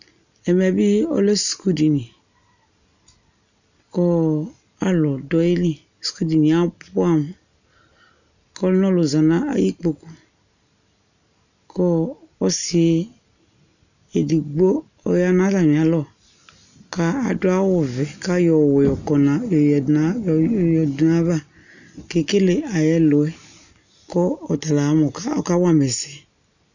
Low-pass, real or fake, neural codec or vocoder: 7.2 kHz; real; none